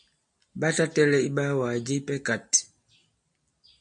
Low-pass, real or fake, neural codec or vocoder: 9.9 kHz; real; none